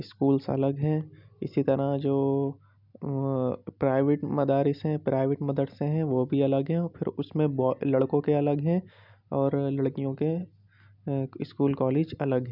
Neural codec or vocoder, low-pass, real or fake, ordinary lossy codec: none; 5.4 kHz; real; none